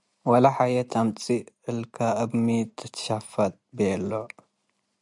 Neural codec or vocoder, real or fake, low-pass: none; real; 10.8 kHz